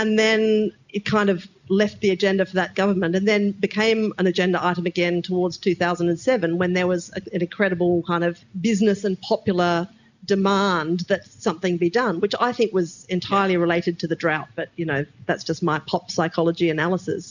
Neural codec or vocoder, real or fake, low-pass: none; real; 7.2 kHz